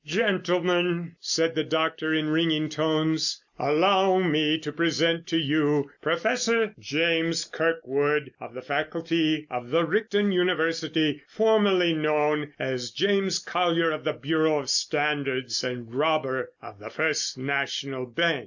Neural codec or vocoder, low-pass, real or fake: none; 7.2 kHz; real